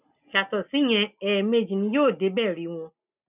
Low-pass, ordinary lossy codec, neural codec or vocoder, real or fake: 3.6 kHz; none; none; real